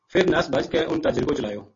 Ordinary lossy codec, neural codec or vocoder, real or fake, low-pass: MP3, 32 kbps; none; real; 7.2 kHz